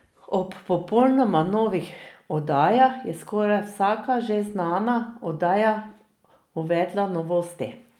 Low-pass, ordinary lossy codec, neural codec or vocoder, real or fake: 19.8 kHz; Opus, 32 kbps; none; real